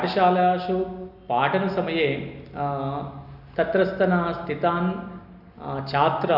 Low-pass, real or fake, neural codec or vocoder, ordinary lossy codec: 5.4 kHz; real; none; none